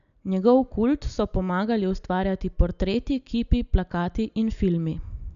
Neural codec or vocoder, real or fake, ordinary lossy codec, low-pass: none; real; none; 7.2 kHz